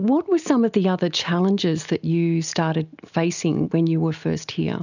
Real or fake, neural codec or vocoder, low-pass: real; none; 7.2 kHz